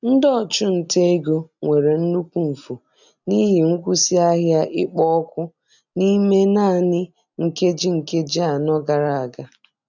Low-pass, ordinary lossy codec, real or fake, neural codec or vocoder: 7.2 kHz; none; real; none